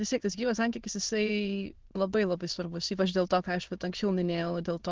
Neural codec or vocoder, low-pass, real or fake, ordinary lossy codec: autoencoder, 22.05 kHz, a latent of 192 numbers a frame, VITS, trained on many speakers; 7.2 kHz; fake; Opus, 16 kbps